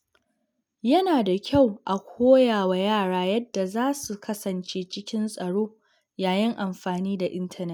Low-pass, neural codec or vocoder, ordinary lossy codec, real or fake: none; none; none; real